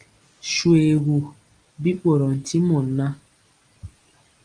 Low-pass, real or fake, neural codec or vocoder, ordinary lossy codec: 9.9 kHz; real; none; Opus, 32 kbps